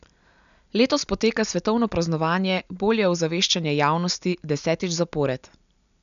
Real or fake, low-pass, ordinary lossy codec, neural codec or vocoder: real; 7.2 kHz; none; none